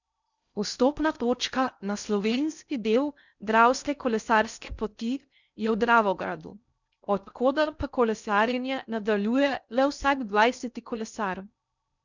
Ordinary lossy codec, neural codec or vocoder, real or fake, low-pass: none; codec, 16 kHz in and 24 kHz out, 0.6 kbps, FocalCodec, streaming, 2048 codes; fake; 7.2 kHz